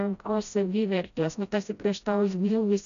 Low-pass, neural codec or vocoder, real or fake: 7.2 kHz; codec, 16 kHz, 0.5 kbps, FreqCodec, smaller model; fake